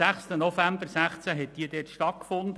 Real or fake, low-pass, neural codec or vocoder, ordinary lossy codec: real; none; none; none